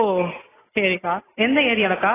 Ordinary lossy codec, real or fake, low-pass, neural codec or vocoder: AAC, 16 kbps; real; 3.6 kHz; none